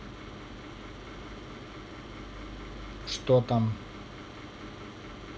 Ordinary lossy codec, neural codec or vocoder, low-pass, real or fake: none; none; none; real